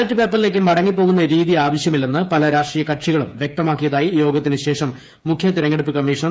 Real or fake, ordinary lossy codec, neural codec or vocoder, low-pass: fake; none; codec, 16 kHz, 8 kbps, FreqCodec, smaller model; none